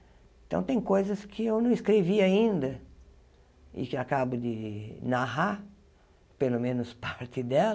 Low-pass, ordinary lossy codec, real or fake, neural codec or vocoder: none; none; real; none